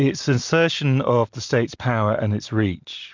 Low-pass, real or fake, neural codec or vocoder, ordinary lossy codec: 7.2 kHz; real; none; MP3, 64 kbps